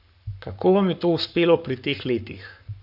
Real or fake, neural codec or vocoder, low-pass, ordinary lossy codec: fake; codec, 44.1 kHz, 7.8 kbps, Pupu-Codec; 5.4 kHz; none